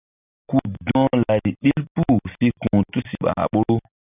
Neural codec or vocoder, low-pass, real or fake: none; 3.6 kHz; real